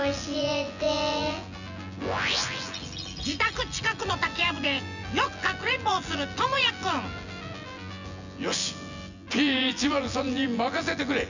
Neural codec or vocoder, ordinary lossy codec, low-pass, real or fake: vocoder, 24 kHz, 100 mel bands, Vocos; none; 7.2 kHz; fake